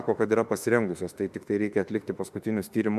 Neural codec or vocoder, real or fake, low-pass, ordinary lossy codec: autoencoder, 48 kHz, 32 numbers a frame, DAC-VAE, trained on Japanese speech; fake; 14.4 kHz; MP3, 96 kbps